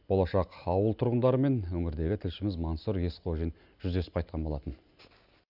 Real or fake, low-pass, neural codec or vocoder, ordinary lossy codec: real; 5.4 kHz; none; none